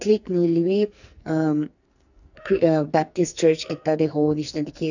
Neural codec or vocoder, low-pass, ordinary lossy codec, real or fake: codec, 44.1 kHz, 2.6 kbps, SNAC; 7.2 kHz; none; fake